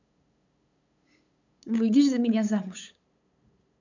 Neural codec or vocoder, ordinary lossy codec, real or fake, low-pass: codec, 16 kHz, 8 kbps, FunCodec, trained on LibriTTS, 25 frames a second; none; fake; 7.2 kHz